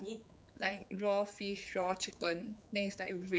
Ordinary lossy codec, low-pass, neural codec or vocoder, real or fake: none; none; codec, 16 kHz, 4 kbps, X-Codec, HuBERT features, trained on general audio; fake